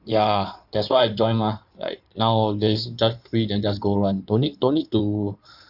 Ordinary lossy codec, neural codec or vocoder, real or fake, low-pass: none; codec, 16 kHz in and 24 kHz out, 2.2 kbps, FireRedTTS-2 codec; fake; 5.4 kHz